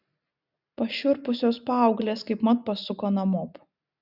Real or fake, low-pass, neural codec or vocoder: real; 5.4 kHz; none